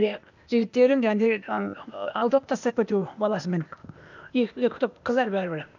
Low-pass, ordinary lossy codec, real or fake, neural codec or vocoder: 7.2 kHz; none; fake; codec, 16 kHz, 0.8 kbps, ZipCodec